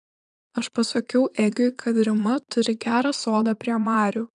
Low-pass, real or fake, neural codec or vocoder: 9.9 kHz; fake; vocoder, 22.05 kHz, 80 mel bands, Vocos